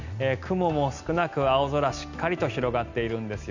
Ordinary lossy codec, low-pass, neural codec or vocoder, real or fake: none; 7.2 kHz; none; real